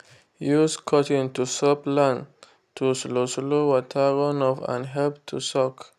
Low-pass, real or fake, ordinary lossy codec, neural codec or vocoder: 14.4 kHz; real; none; none